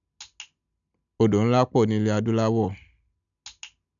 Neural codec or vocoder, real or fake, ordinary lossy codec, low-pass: none; real; none; 7.2 kHz